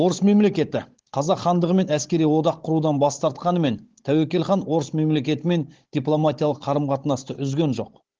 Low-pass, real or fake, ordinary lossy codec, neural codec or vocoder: 7.2 kHz; fake; Opus, 16 kbps; codec, 16 kHz, 16 kbps, FunCodec, trained on Chinese and English, 50 frames a second